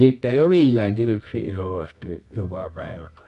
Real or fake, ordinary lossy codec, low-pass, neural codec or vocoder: fake; none; 10.8 kHz; codec, 24 kHz, 0.9 kbps, WavTokenizer, medium music audio release